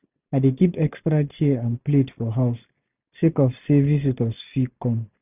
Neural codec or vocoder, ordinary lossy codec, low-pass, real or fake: none; none; 3.6 kHz; real